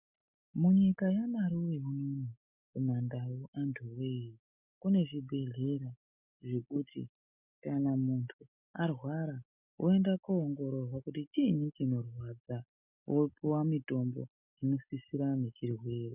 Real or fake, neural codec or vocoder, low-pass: real; none; 3.6 kHz